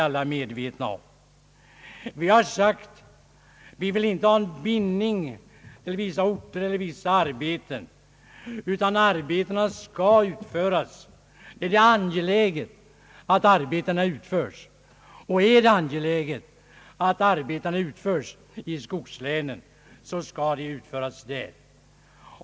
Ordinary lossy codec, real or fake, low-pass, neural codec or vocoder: none; real; none; none